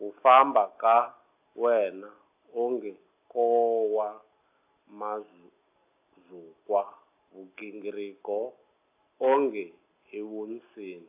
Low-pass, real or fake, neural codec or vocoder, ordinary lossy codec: 3.6 kHz; real; none; AAC, 24 kbps